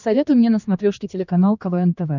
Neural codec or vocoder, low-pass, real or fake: codec, 16 kHz, 2 kbps, X-Codec, HuBERT features, trained on general audio; 7.2 kHz; fake